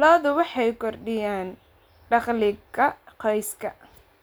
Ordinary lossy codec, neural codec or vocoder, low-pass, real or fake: none; none; none; real